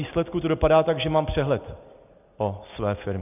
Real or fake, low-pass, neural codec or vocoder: real; 3.6 kHz; none